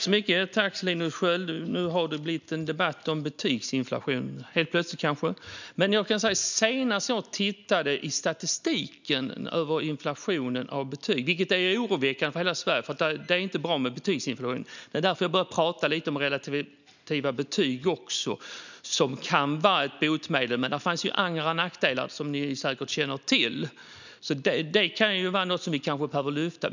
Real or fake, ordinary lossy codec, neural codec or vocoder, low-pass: real; none; none; 7.2 kHz